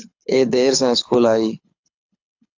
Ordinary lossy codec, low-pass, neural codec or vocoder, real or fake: AAC, 48 kbps; 7.2 kHz; codec, 24 kHz, 6 kbps, HILCodec; fake